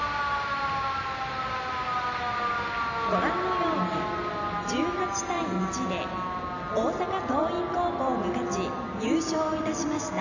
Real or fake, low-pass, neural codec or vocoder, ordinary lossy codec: real; 7.2 kHz; none; none